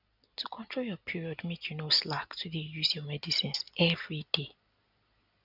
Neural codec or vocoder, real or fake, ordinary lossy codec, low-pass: none; real; none; 5.4 kHz